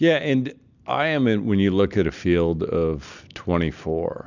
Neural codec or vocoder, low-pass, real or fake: none; 7.2 kHz; real